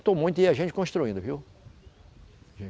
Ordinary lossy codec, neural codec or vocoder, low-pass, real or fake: none; none; none; real